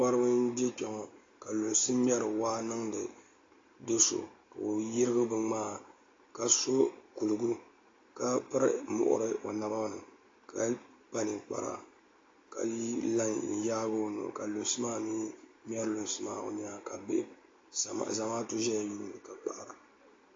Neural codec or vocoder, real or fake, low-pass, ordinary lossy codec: none; real; 7.2 kHz; AAC, 32 kbps